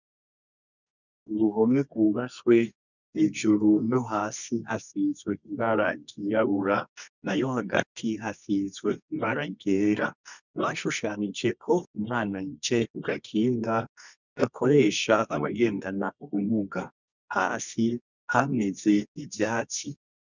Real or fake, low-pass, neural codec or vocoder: fake; 7.2 kHz; codec, 24 kHz, 0.9 kbps, WavTokenizer, medium music audio release